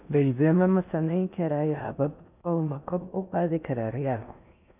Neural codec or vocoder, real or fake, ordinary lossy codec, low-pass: codec, 16 kHz in and 24 kHz out, 0.6 kbps, FocalCodec, streaming, 2048 codes; fake; none; 3.6 kHz